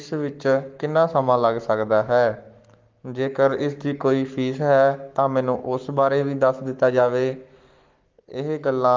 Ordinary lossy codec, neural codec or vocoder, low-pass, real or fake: Opus, 24 kbps; codec, 44.1 kHz, 7.8 kbps, Pupu-Codec; 7.2 kHz; fake